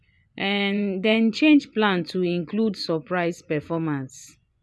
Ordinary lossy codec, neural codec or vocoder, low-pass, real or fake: none; vocoder, 24 kHz, 100 mel bands, Vocos; none; fake